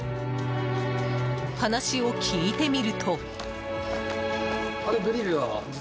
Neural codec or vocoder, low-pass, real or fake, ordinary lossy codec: none; none; real; none